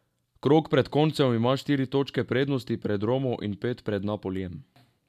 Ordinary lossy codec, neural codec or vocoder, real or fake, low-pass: MP3, 96 kbps; none; real; 14.4 kHz